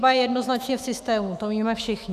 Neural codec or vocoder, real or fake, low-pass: autoencoder, 48 kHz, 128 numbers a frame, DAC-VAE, trained on Japanese speech; fake; 14.4 kHz